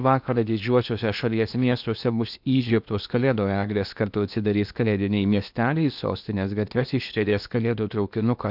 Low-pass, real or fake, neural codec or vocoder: 5.4 kHz; fake; codec, 16 kHz in and 24 kHz out, 0.8 kbps, FocalCodec, streaming, 65536 codes